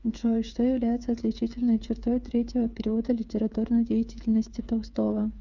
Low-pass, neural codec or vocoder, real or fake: 7.2 kHz; codec, 16 kHz, 8 kbps, FreqCodec, smaller model; fake